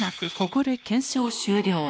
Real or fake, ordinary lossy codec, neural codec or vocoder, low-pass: fake; none; codec, 16 kHz, 1 kbps, X-Codec, WavLM features, trained on Multilingual LibriSpeech; none